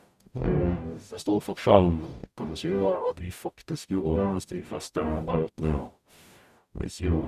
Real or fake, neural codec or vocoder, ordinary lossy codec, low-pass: fake; codec, 44.1 kHz, 0.9 kbps, DAC; none; 14.4 kHz